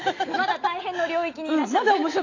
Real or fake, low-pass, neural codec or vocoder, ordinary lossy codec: real; 7.2 kHz; none; AAC, 32 kbps